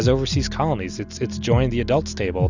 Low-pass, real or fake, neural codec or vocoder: 7.2 kHz; real; none